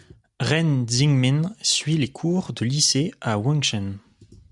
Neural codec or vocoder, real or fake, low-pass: none; real; 10.8 kHz